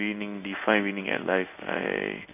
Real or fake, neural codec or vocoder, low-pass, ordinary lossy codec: fake; codec, 16 kHz in and 24 kHz out, 1 kbps, XY-Tokenizer; 3.6 kHz; none